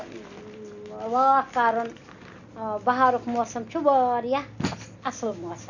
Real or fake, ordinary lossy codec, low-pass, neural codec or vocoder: real; none; 7.2 kHz; none